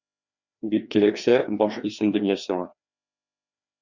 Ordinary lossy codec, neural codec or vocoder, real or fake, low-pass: Opus, 64 kbps; codec, 16 kHz, 2 kbps, FreqCodec, larger model; fake; 7.2 kHz